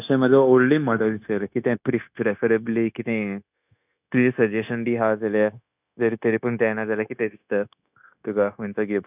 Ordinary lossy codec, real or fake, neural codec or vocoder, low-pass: none; fake; codec, 16 kHz, 0.9 kbps, LongCat-Audio-Codec; 3.6 kHz